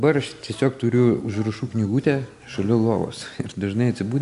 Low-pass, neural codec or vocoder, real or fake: 10.8 kHz; none; real